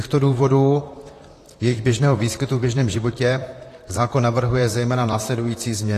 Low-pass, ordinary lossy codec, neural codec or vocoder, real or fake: 14.4 kHz; AAC, 48 kbps; vocoder, 44.1 kHz, 128 mel bands, Pupu-Vocoder; fake